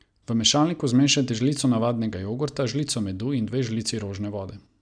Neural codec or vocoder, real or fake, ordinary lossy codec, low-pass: none; real; none; 9.9 kHz